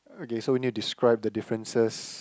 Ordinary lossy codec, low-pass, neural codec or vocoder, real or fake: none; none; none; real